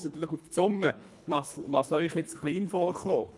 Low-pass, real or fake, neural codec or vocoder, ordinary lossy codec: none; fake; codec, 24 kHz, 1.5 kbps, HILCodec; none